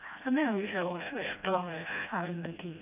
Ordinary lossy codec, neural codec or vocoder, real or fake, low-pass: none; codec, 16 kHz, 1 kbps, FreqCodec, smaller model; fake; 3.6 kHz